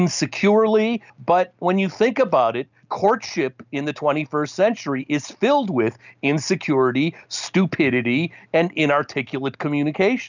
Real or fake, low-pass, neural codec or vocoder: real; 7.2 kHz; none